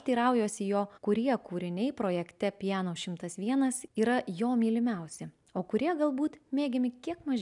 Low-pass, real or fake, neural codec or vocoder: 10.8 kHz; real; none